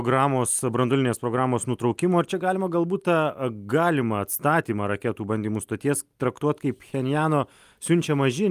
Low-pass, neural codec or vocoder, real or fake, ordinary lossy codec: 14.4 kHz; none; real; Opus, 32 kbps